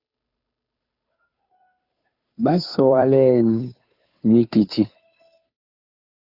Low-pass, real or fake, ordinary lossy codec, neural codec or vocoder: 5.4 kHz; fake; AAC, 48 kbps; codec, 16 kHz, 2 kbps, FunCodec, trained on Chinese and English, 25 frames a second